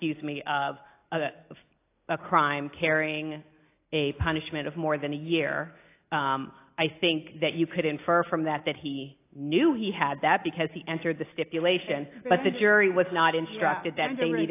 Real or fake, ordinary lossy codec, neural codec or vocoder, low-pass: real; AAC, 24 kbps; none; 3.6 kHz